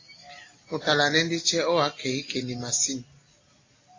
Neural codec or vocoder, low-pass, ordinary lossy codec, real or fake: none; 7.2 kHz; AAC, 32 kbps; real